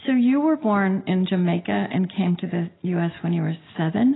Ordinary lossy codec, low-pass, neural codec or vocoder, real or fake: AAC, 16 kbps; 7.2 kHz; none; real